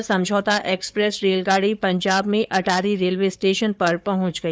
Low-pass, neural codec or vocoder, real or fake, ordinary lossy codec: none; codec, 16 kHz, 4 kbps, FunCodec, trained on Chinese and English, 50 frames a second; fake; none